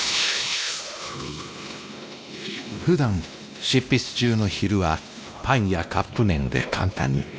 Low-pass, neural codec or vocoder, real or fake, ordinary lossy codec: none; codec, 16 kHz, 1 kbps, X-Codec, WavLM features, trained on Multilingual LibriSpeech; fake; none